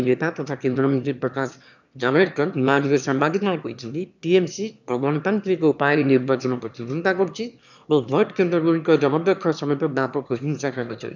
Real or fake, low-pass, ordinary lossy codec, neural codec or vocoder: fake; 7.2 kHz; none; autoencoder, 22.05 kHz, a latent of 192 numbers a frame, VITS, trained on one speaker